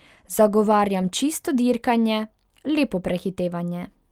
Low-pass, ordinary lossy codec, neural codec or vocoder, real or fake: 19.8 kHz; Opus, 32 kbps; none; real